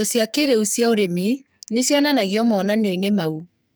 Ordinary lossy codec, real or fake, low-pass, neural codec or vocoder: none; fake; none; codec, 44.1 kHz, 2.6 kbps, SNAC